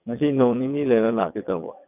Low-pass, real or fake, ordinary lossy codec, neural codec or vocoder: 3.6 kHz; fake; none; vocoder, 22.05 kHz, 80 mel bands, WaveNeXt